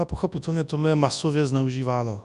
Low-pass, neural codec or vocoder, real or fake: 10.8 kHz; codec, 24 kHz, 0.9 kbps, WavTokenizer, large speech release; fake